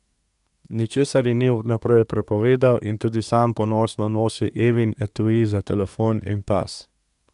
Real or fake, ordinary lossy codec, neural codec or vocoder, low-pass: fake; none; codec, 24 kHz, 1 kbps, SNAC; 10.8 kHz